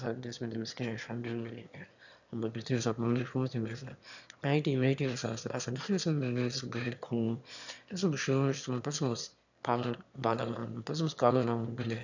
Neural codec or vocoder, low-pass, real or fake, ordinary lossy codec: autoencoder, 22.05 kHz, a latent of 192 numbers a frame, VITS, trained on one speaker; 7.2 kHz; fake; none